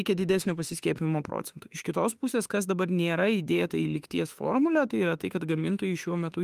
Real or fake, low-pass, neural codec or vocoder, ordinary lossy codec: fake; 14.4 kHz; autoencoder, 48 kHz, 32 numbers a frame, DAC-VAE, trained on Japanese speech; Opus, 32 kbps